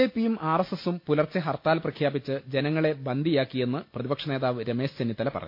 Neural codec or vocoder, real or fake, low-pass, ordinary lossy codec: none; real; 5.4 kHz; MP3, 32 kbps